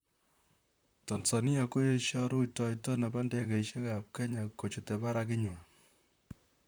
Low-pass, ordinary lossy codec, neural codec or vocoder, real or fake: none; none; vocoder, 44.1 kHz, 128 mel bands, Pupu-Vocoder; fake